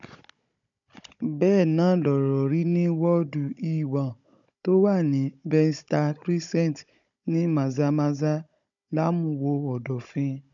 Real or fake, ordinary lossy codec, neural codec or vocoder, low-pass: fake; none; codec, 16 kHz, 16 kbps, FunCodec, trained on Chinese and English, 50 frames a second; 7.2 kHz